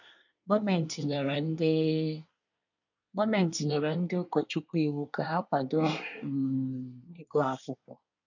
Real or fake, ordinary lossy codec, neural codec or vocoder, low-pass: fake; none; codec, 24 kHz, 1 kbps, SNAC; 7.2 kHz